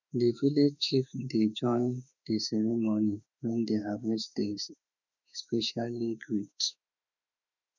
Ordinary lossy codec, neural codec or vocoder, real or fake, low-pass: none; autoencoder, 48 kHz, 32 numbers a frame, DAC-VAE, trained on Japanese speech; fake; 7.2 kHz